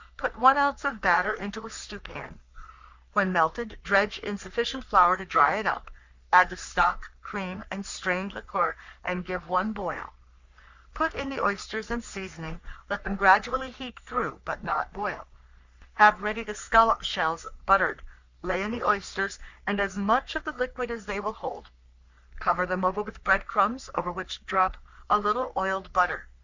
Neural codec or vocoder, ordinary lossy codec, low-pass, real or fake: codec, 44.1 kHz, 3.4 kbps, Pupu-Codec; Opus, 64 kbps; 7.2 kHz; fake